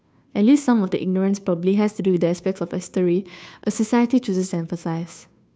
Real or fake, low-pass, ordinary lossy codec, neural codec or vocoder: fake; none; none; codec, 16 kHz, 2 kbps, FunCodec, trained on Chinese and English, 25 frames a second